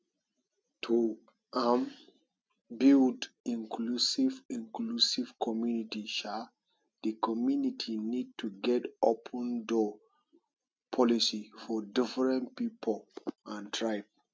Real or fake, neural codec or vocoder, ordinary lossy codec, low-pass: real; none; none; none